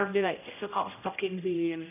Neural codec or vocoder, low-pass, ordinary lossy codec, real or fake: codec, 16 kHz, 0.5 kbps, X-Codec, HuBERT features, trained on general audio; 3.6 kHz; none; fake